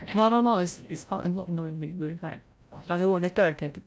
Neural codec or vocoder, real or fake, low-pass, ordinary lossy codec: codec, 16 kHz, 0.5 kbps, FreqCodec, larger model; fake; none; none